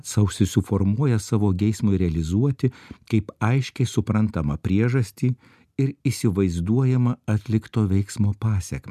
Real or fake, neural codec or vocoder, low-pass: real; none; 14.4 kHz